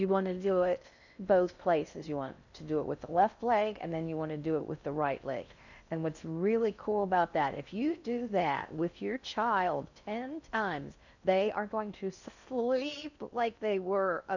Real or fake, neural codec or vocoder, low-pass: fake; codec, 16 kHz in and 24 kHz out, 0.6 kbps, FocalCodec, streaming, 2048 codes; 7.2 kHz